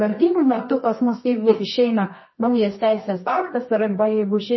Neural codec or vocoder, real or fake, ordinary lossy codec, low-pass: codec, 24 kHz, 0.9 kbps, WavTokenizer, medium music audio release; fake; MP3, 24 kbps; 7.2 kHz